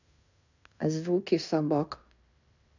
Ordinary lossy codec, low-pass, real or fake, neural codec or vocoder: none; 7.2 kHz; fake; codec, 16 kHz in and 24 kHz out, 0.9 kbps, LongCat-Audio-Codec, fine tuned four codebook decoder